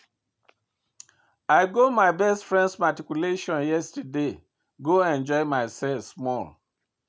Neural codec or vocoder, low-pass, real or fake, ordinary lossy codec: none; none; real; none